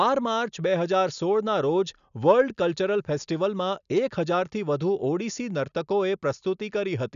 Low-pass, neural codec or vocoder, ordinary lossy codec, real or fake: 7.2 kHz; none; none; real